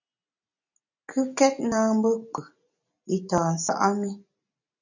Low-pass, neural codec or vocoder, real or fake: 7.2 kHz; none; real